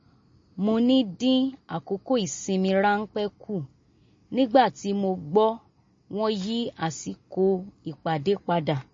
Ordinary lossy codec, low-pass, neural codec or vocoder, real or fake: MP3, 32 kbps; 7.2 kHz; none; real